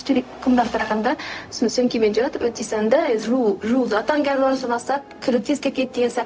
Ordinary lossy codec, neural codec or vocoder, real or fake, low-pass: none; codec, 16 kHz, 0.4 kbps, LongCat-Audio-Codec; fake; none